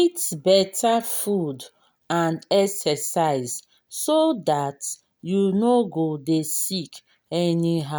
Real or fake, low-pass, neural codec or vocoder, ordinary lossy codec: real; none; none; none